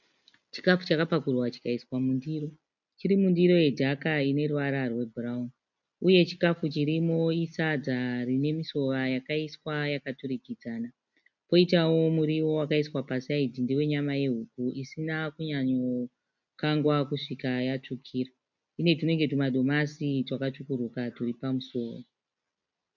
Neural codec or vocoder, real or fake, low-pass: none; real; 7.2 kHz